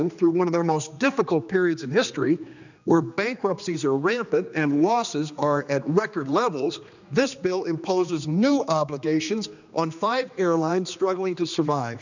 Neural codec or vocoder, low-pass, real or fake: codec, 16 kHz, 2 kbps, X-Codec, HuBERT features, trained on general audio; 7.2 kHz; fake